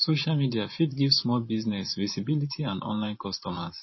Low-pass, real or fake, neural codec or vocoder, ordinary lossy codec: 7.2 kHz; fake; vocoder, 44.1 kHz, 128 mel bands every 512 samples, BigVGAN v2; MP3, 24 kbps